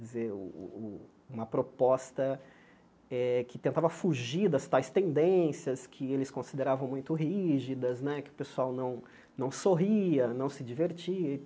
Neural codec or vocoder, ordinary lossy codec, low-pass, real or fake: none; none; none; real